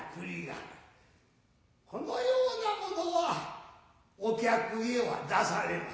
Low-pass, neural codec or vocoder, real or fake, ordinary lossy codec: none; none; real; none